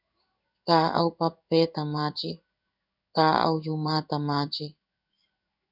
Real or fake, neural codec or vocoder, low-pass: fake; codec, 16 kHz in and 24 kHz out, 1 kbps, XY-Tokenizer; 5.4 kHz